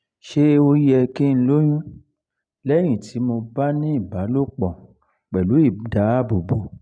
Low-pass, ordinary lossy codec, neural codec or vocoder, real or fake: 9.9 kHz; none; none; real